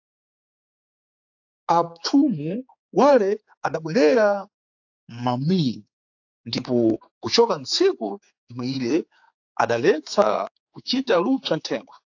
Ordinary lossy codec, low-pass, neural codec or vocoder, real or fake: AAC, 48 kbps; 7.2 kHz; codec, 16 kHz, 4 kbps, X-Codec, HuBERT features, trained on general audio; fake